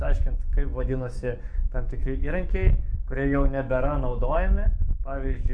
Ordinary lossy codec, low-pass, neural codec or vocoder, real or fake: AAC, 64 kbps; 9.9 kHz; codec, 44.1 kHz, 7.8 kbps, DAC; fake